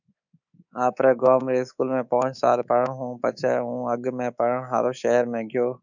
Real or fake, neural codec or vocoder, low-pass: fake; codec, 24 kHz, 3.1 kbps, DualCodec; 7.2 kHz